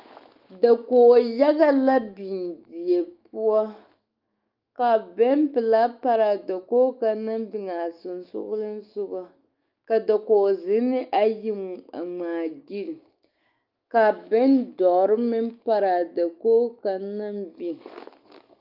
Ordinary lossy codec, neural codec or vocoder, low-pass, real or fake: Opus, 24 kbps; autoencoder, 48 kHz, 128 numbers a frame, DAC-VAE, trained on Japanese speech; 5.4 kHz; fake